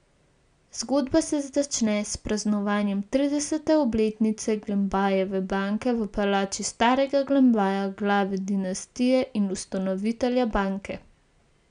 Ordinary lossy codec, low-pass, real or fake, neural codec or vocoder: none; 9.9 kHz; real; none